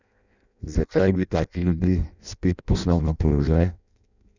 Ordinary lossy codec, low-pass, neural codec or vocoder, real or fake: none; 7.2 kHz; codec, 16 kHz in and 24 kHz out, 0.6 kbps, FireRedTTS-2 codec; fake